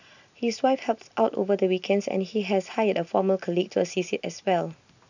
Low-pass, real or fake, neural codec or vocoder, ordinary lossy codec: 7.2 kHz; real; none; none